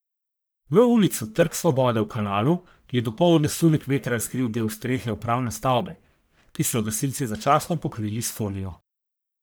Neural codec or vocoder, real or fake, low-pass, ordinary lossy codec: codec, 44.1 kHz, 1.7 kbps, Pupu-Codec; fake; none; none